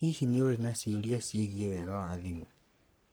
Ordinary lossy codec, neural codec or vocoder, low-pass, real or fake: none; codec, 44.1 kHz, 3.4 kbps, Pupu-Codec; none; fake